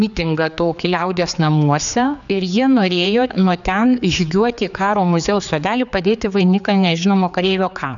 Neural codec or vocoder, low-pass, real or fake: codec, 16 kHz, 4 kbps, X-Codec, HuBERT features, trained on general audio; 7.2 kHz; fake